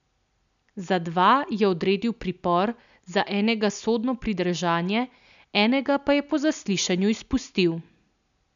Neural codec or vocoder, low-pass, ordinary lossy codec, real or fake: none; 7.2 kHz; none; real